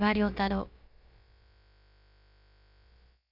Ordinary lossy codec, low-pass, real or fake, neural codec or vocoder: none; 5.4 kHz; fake; codec, 16 kHz, about 1 kbps, DyCAST, with the encoder's durations